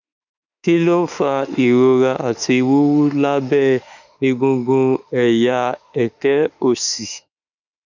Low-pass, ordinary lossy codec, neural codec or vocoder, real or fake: 7.2 kHz; none; autoencoder, 48 kHz, 32 numbers a frame, DAC-VAE, trained on Japanese speech; fake